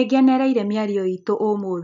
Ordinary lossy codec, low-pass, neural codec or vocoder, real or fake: none; 7.2 kHz; none; real